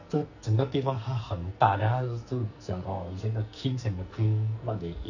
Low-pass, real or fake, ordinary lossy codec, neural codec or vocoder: 7.2 kHz; fake; none; codec, 44.1 kHz, 2.6 kbps, SNAC